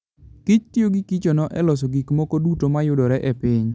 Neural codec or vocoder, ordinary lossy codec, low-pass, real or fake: none; none; none; real